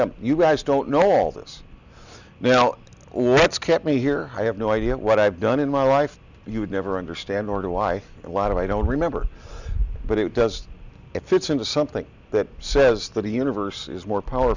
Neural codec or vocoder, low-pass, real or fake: none; 7.2 kHz; real